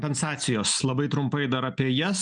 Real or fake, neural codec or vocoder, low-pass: real; none; 9.9 kHz